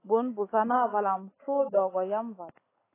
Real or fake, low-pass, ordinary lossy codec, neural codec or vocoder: real; 3.6 kHz; AAC, 16 kbps; none